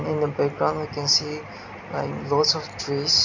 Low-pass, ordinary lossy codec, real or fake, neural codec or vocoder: 7.2 kHz; none; real; none